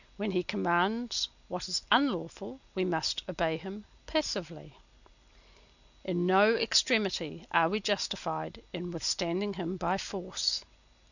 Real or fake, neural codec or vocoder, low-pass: real; none; 7.2 kHz